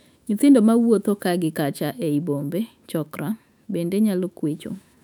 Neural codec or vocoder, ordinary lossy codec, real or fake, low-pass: autoencoder, 48 kHz, 128 numbers a frame, DAC-VAE, trained on Japanese speech; none; fake; 19.8 kHz